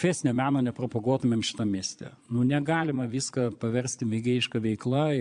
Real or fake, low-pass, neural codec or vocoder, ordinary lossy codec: fake; 9.9 kHz; vocoder, 22.05 kHz, 80 mel bands, Vocos; MP3, 96 kbps